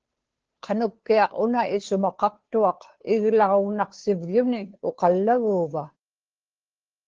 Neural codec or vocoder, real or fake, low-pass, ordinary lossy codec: codec, 16 kHz, 2 kbps, FunCodec, trained on Chinese and English, 25 frames a second; fake; 7.2 kHz; Opus, 16 kbps